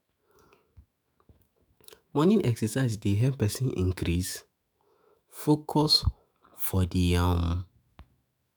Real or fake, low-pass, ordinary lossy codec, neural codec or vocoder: fake; none; none; autoencoder, 48 kHz, 128 numbers a frame, DAC-VAE, trained on Japanese speech